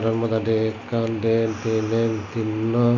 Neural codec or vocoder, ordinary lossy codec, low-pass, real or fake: none; MP3, 64 kbps; 7.2 kHz; real